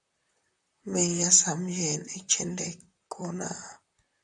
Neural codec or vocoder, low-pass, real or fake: vocoder, 44.1 kHz, 128 mel bands, Pupu-Vocoder; 10.8 kHz; fake